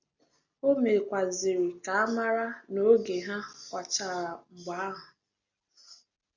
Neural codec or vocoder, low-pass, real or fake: none; 7.2 kHz; real